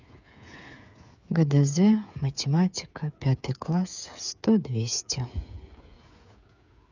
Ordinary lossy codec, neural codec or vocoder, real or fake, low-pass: none; codec, 16 kHz, 8 kbps, FreqCodec, smaller model; fake; 7.2 kHz